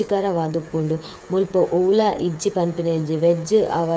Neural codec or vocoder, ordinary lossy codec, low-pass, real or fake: codec, 16 kHz, 8 kbps, FreqCodec, smaller model; none; none; fake